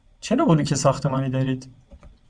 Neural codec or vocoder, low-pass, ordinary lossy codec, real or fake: vocoder, 22.05 kHz, 80 mel bands, WaveNeXt; 9.9 kHz; MP3, 96 kbps; fake